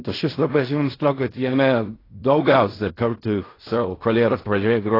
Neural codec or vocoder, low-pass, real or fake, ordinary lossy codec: codec, 16 kHz in and 24 kHz out, 0.4 kbps, LongCat-Audio-Codec, fine tuned four codebook decoder; 5.4 kHz; fake; AAC, 24 kbps